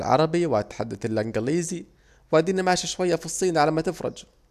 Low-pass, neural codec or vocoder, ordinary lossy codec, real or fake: 14.4 kHz; none; none; real